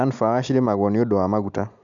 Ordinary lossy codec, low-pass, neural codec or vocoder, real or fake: none; 7.2 kHz; none; real